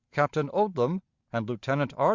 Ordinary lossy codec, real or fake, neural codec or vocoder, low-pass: Opus, 64 kbps; real; none; 7.2 kHz